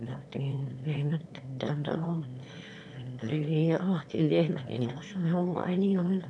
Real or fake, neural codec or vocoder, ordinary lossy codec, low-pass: fake; autoencoder, 22.05 kHz, a latent of 192 numbers a frame, VITS, trained on one speaker; none; none